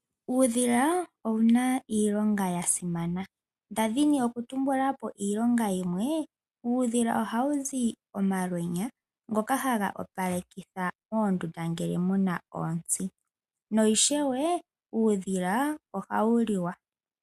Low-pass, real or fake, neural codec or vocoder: 14.4 kHz; real; none